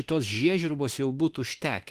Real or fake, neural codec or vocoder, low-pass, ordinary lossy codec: fake; autoencoder, 48 kHz, 32 numbers a frame, DAC-VAE, trained on Japanese speech; 14.4 kHz; Opus, 24 kbps